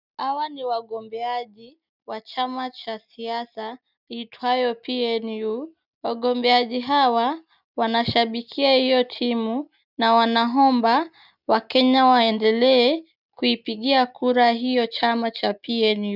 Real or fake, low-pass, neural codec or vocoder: real; 5.4 kHz; none